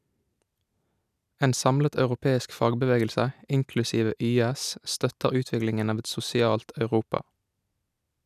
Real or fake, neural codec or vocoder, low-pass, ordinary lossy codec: real; none; 14.4 kHz; none